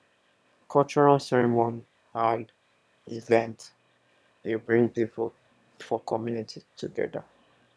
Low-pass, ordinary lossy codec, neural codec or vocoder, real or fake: none; none; autoencoder, 22.05 kHz, a latent of 192 numbers a frame, VITS, trained on one speaker; fake